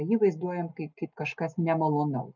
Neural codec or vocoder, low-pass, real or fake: none; 7.2 kHz; real